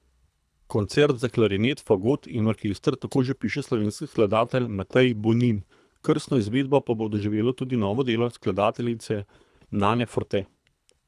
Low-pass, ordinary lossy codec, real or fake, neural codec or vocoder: none; none; fake; codec, 24 kHz, 3 kbps, HILCodec